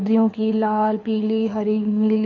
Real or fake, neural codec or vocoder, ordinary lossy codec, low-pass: fake; codec, 24 kHz, 6 kbps, HILCodec; none; 7.2 kHz